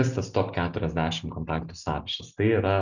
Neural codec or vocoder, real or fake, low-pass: none; real; 7.2 kHz